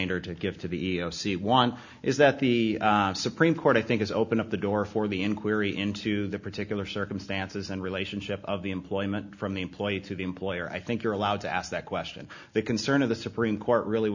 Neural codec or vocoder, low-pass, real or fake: none; 7.2 kHz; real